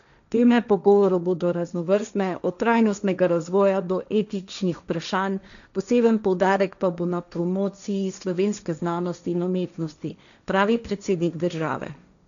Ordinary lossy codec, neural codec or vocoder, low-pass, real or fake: none; codec, 16 kHz, 1.1 kbps, Voila-Tokenizer; 7.2 kHz; fake